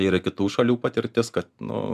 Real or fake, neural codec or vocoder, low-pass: real; none; 14.4 kHz